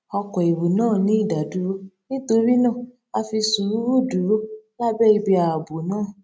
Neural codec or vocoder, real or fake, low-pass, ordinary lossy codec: none; real; none; none